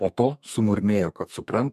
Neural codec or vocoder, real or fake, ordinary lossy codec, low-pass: codec, 32 kHz, 1.9 kbps, SNAC; fake; MP3, 96 kbps; 14.4 kHz